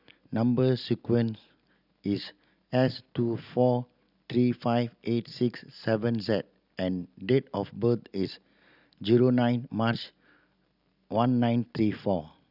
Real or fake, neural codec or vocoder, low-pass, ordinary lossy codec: real; none; 5.4 kHz; none